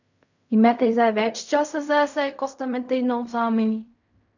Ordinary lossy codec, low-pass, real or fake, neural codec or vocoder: none; 7.2 kHz; fake; codec, 16 kHz in and 24 kHz out, 0.4 kbps, LongCat-Audio-Codec, fine tuned four codebook decoder